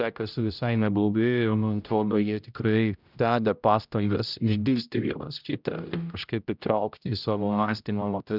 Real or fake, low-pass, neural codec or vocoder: fake; 5.4 kHz; codec, 16 kHz, 0.5 kbps, X-Codec, HuBERT features, trained on general audio